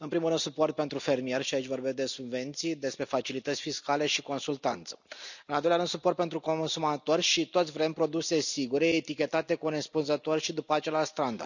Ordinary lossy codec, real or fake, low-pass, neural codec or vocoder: none; real; 7.2 kHz; none